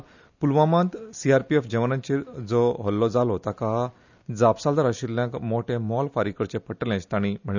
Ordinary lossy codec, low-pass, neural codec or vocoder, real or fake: none; 7.2 kHz; none; real